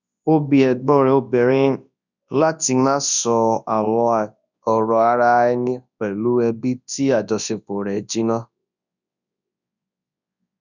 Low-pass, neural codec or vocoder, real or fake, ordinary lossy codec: 7.2 kHz; codec, 24 kHz, 0.9 kbps, WavTokenizer, large speech release; fake; none